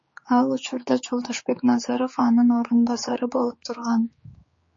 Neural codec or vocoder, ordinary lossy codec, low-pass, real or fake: codec, 16 kHz, 4 kbps, X-Codec, HuBERT features, trained on general audio; MP3, 32 kbps; 7.2 kHz; fake